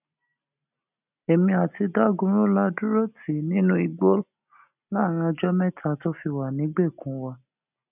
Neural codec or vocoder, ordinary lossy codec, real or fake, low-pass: none; none; real; 3.6 kHz